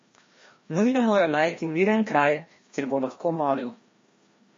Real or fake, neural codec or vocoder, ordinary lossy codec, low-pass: fake; codec, 16 kHz, 1 kbps, FreqCodec, larger model; MP3, 32 kbps; 7.2 kHz